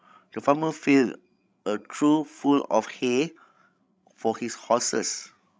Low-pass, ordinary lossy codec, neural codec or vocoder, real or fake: none; none; codec, 16 kHz, 16 kbps, FreqCodec, larger model; fake